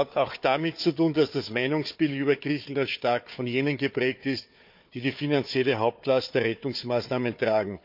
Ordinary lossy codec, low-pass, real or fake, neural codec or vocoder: MP3, 48 kbps; 5.4 kHz; fake; codec, 16 kHz, 4 kbps, FunCodec, trained on Chinese and English, 50 frames a second